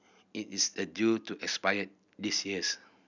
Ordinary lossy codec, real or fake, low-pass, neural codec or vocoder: none; real; 7.2 kHz; none